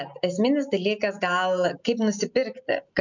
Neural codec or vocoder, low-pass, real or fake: none; 7.2 kHz; real